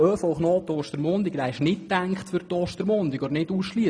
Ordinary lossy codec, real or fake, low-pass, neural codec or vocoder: none; real; none; none